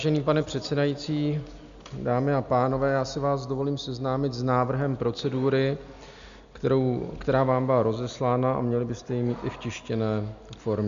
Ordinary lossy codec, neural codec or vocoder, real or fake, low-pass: Opus, 64 kbps; none; real; 7.2 kHz